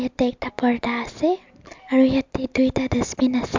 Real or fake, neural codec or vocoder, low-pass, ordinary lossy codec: real; none; 7.2 kHz; MP3, 64 kbps